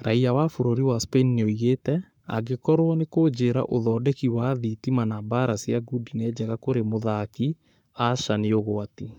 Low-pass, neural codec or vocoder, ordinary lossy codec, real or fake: 19.8 kHz; codec, 44.1 kHz, 7.8 kbps, Pupu-Codec; none; fake